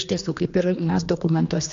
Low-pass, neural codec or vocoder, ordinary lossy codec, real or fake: 7.2 kHz; codec, 16 kHz, 2 kbps, X-Codec, HuBERT features, trained on general audio; AAC, 48 kbps; fake